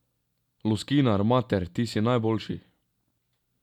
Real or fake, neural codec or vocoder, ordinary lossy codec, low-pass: real; none; none; 19.8 kHz